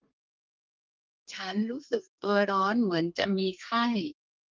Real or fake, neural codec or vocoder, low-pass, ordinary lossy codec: fake; codec, 32 kHz, 1.9 kbps, SNAC; 7.2 kHz; Opus, 32 kbps